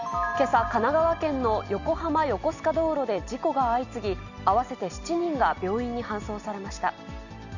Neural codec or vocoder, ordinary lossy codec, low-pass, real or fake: none; none; 7.2 kHz; real